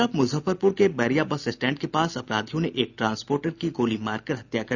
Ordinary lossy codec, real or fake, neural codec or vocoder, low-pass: none; real; none; 7.2 kHz